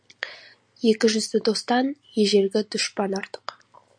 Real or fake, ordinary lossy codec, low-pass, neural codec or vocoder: real; MP3, 64 kbps; 9.9 kHz; none